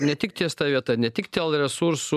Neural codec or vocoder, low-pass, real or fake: none; 14.4 kHz; real